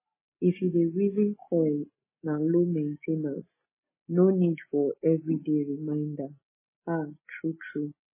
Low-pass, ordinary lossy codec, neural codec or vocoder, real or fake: 3.6 kHz; MP3, 16 kbps; none; real